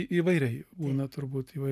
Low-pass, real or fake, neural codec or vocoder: 14.4 kHz; real; none